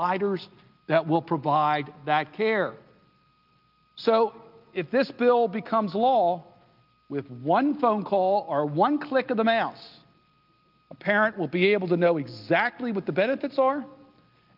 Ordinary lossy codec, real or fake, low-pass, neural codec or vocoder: Opus, 24 kbps; real; 5.4 kHz; none